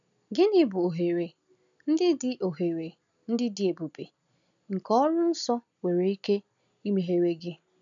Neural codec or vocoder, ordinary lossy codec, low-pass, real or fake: none; none; 7.2 kHz; real